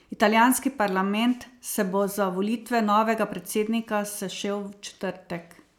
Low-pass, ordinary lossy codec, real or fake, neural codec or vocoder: 19.8 kHz; none; real; none